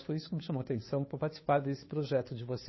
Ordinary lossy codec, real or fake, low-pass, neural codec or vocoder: MP3, 24 kbps; fake; 7.2 kHz; codec, 16 kHz, 2 kbps, FunCodec, trained on Chinese and English, 25 frames a second